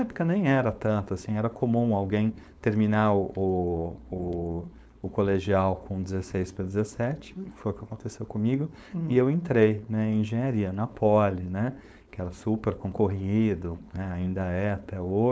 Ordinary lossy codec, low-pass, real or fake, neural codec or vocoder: none; none; fake; codec, 16 kHz, 4.8 kbps, FACodec